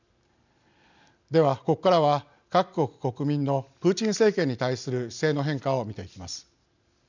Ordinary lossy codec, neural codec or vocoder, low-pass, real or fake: none; none; 7.2 kHz; real